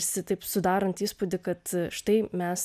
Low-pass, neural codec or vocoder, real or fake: 14.4 kHz; none; real